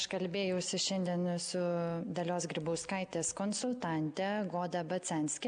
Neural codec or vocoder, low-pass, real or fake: none; 9.9 kHz; real